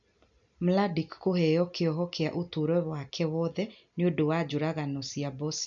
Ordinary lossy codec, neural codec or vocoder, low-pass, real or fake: none; none; 7.2 kHz; real